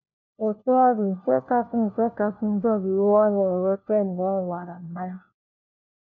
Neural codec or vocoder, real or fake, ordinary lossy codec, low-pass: codec, 16 kHz, 1 kbps, FunCodec, trained on LibriTTS, 50 frames a second; fake; MP3, 48 kbps; 7.2 kHz